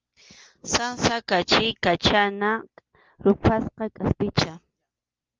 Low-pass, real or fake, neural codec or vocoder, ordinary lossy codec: 7.2 kHz; real; none; Opus, 24 kbps